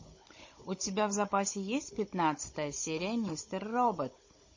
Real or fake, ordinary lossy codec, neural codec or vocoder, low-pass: fake; MP3, 32 kbps; codec, 16 kHz, 16 kbps, FunCodec, trained on Chinese and English, 50 frames a second; 7.2 kHz